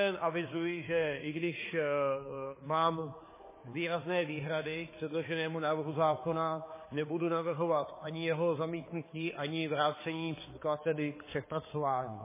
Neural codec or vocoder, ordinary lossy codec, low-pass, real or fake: codec, 16 kHz, 4 kbps, X-Codec, HuBERT features, trained on balanced general audio; MP3, 16 kbps; 3.6 kHz; fake